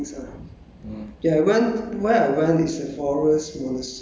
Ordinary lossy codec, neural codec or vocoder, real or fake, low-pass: none; none; real; none